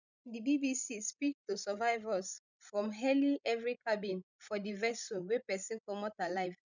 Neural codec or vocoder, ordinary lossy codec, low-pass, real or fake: codec, 16 kHz, 16 kbps, FreqCodec, larger model; none; 7.2 kHz; fake